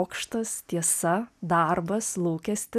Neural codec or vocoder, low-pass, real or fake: none; 14.4 kHz; real